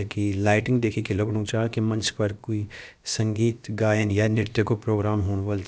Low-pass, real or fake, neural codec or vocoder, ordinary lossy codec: none; fake; codec, 16 kHz, about 1 kbps, DyCAST, with the encoder's durations; none